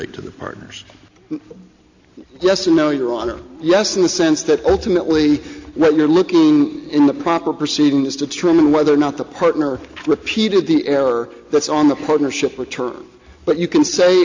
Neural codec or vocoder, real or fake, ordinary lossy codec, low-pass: none; real; AAC, 48 kbps; 7.2 kHz